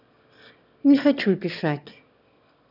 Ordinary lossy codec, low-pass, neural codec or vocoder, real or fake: none; 5.4 kHz; autoencoder, 22.05 kHz, a latent of 192 numbers a frame, VITS, trained on one speaker; fake